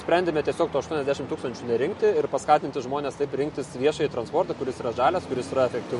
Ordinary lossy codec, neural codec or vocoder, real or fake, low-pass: MP3, 48 kbps; none; real; 14.4 kHz